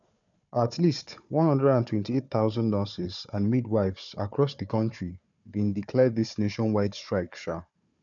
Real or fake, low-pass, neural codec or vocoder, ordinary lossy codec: fake; 7.2 kHz; codec, 16 kHz, 4 kbps, FunCodec, trained on Chinese and English, 50 frames a second; none